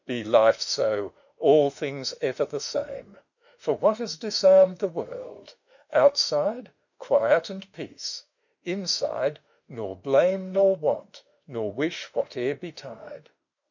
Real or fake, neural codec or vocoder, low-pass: fake; autoencoder, 48 kHz, 32 numbers a frame, DAC-VAE, trained on Japanese speech; 7.2 kHz